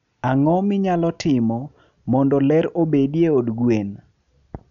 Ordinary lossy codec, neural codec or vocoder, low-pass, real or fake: none; none; 7.2 kHz; real